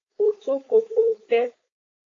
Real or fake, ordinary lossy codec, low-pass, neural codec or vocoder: fake; AAC, 32 kbps; 7.2 kHz; codec, 16 kHz, 4.8 kbps, FACodec